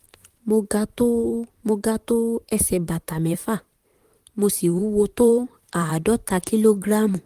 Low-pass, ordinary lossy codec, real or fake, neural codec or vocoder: 14.4 kHz; Opus, 24 kbps; fake; vocoder, 44.1 kHz, 128 mel bands, Pupu-Vocoder